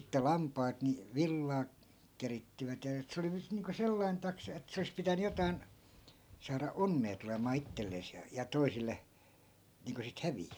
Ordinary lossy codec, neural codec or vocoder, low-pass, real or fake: none; none; none; real